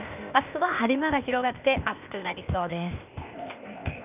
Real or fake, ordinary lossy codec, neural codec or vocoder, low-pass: fake; none; codec, 16 kHz, 0.8 kbps, ZipCodec; 3.6 kHz